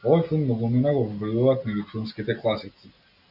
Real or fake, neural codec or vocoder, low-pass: real; none; 5.4 kHz